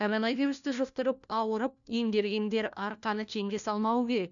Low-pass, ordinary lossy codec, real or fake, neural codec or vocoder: 7.2 kHz; none; fake; codec, 16 kHz, 1 kbps, FunCodec, trained on LibriTTS, 50 frames a second